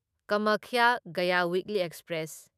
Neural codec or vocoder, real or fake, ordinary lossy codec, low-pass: autoencoder, 48 kHz, 128 numbers a frame, DAC-VAE, trained on Japanese speech; fake; none; 14.4 kHz